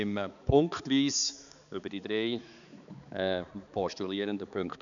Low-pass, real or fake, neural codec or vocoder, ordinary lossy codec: 7.2 kHz; fake; codec, 16 kHz, 4 kbps, X-Codec, HuBERT features, trained on balanced general audio; none